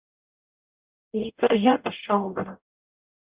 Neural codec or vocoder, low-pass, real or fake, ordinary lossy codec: codec, 44.1 kHz, 0.9 kbps, DAC; 3.6 kHz; fake; Opus, 64 kbps